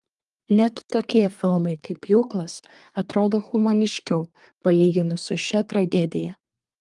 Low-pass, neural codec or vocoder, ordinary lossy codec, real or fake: 10.8 kHz; codec, 24 kHz, 1 kbps, SNAC; Opus, 32 kbps; fake